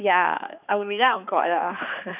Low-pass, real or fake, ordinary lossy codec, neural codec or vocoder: 3.6 kHz; fake; none; codec, 16 kHz, 2 kbps, X-Codec, HuBERT features, trained on balanced general audio